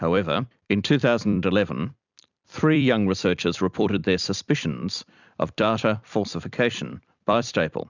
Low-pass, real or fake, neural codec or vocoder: 7.2 kHz; fake; vocoder, 44.1 kHz, 128 mel bands every 256 samples, BigVGAN v2